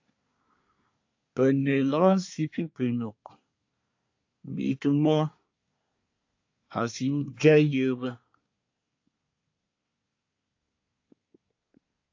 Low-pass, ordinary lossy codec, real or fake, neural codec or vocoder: 7.2 kHz; AAC, 48 kbps; fake; codec, 24 kHz, 1 kbps, SNAC